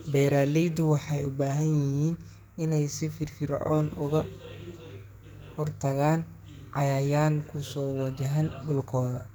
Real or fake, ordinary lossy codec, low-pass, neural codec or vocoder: fake; none; none; codec, 44.1 kHz, 2.6 kbps, SNAC